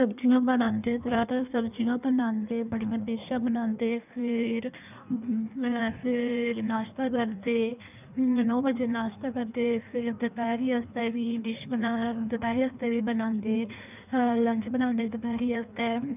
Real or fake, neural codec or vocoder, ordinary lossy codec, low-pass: fake; codec, 16 kHz in and 24 kHz out, 1.1 kbps, FireRedTTS-2 codec; none; 3.6 kHz